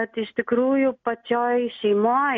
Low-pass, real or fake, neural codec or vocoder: 7.2 kHz; real; none